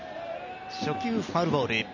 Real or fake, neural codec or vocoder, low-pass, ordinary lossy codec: real; none; 7.2 kHz; none